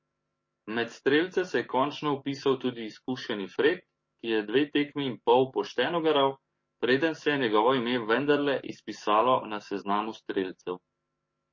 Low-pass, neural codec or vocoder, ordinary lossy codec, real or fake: 7.2 kHz; codec, 44.1 kHz, 7.8 kbps, DAC; MP3, 32 kbps; fake